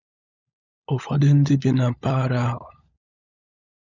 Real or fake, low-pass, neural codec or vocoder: fake; 7.2 kHz; codec, 16 kHz, 16 kbps, FunCodec, trained on LibriTTS, 50 frames a second